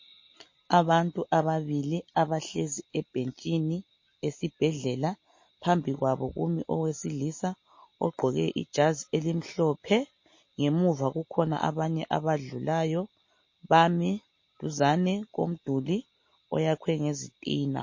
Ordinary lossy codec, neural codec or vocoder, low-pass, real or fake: MP3, 32 kbps; none; 7.2 kHz; real